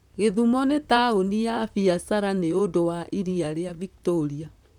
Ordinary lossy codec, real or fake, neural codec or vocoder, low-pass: MP3, 96 kbps; fake; vocoder, 44.1 kHz, 128 mel bands, Pupu-Vocoder; 19.8 kHz